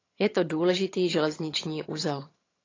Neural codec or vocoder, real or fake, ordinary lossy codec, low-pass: vocoder, 22.05 kHz, 80 mel bands, HiFi-GAN; fake; AAC, 32 kbps; 7.2 kHz